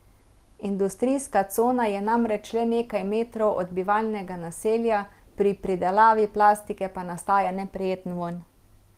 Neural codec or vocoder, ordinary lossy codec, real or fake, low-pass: none; Opus, 24 kbps; real; 14.4 kHz